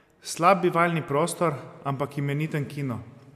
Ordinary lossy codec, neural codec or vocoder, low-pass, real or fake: MP3, 96 kbps; none; 14.4 kHz; real